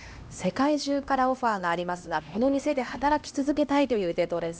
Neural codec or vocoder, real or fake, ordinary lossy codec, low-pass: codec, 16 kHz, 1 kbps, X-Codec, HuBERT features, trained on LibriSpeech; fake; none; none